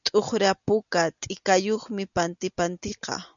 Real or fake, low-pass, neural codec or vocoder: real; 7.2 kHz; none